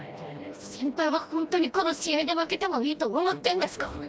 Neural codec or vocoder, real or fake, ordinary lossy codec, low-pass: codec, 16 kHz, 1 kbps, FreqCodec, smaller model; fake; none; none